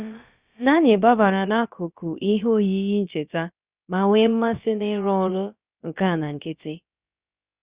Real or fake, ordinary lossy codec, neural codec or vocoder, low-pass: fake; Opus, 64 kbps; codec, 16 kHz, about 1 kbps, DyCAST, with the encoder's durations; 3.6 kHz